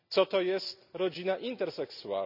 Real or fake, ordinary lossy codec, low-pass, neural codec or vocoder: real; none; 5.4 kHz; none